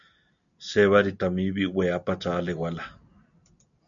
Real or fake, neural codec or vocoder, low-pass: real; none; 7.2 kHz